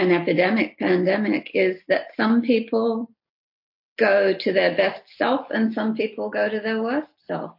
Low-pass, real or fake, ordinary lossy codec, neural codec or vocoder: 5.4 kHz; real; MP3, 32 kbps; none